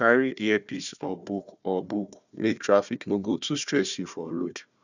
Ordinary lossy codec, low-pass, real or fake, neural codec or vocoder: none; 7.2 kHz; fake; codec, 16 kHz, 1 kbps, FunCodec, trained on Chinese and English, 50 frames a second